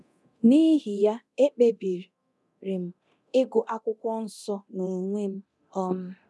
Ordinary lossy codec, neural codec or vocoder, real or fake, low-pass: none; codec, 24 kHz, 0.9 kbps, DualCodec; fake; none